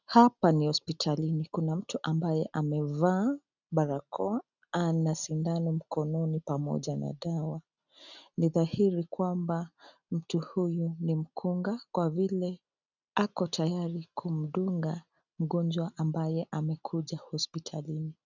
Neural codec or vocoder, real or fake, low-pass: none; real; 7.2 kHz